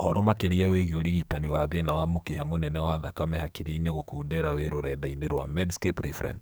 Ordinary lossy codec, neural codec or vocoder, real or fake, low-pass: none; codec, 44.1 kHz, 2.6 kbps, SNAC; fake; none